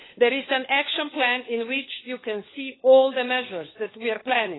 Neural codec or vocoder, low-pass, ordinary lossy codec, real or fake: codec, 16 kHz, 4 kbps, FunCodec, trained on LibriTTS, 50 frames a second; 7.2 kHz; AAC, 16 kbps; fake